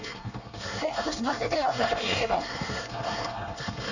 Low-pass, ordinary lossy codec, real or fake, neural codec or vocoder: 7.2 kHz; none; fake; codec, 24 kHz, 1 kbps, SNAC